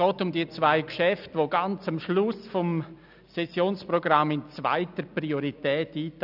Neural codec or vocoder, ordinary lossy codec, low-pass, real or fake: none; none; 5.4 kHz; real